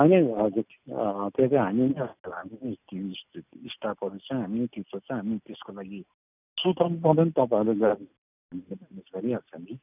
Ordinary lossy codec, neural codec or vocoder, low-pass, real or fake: none; none; 3.6 kHz; real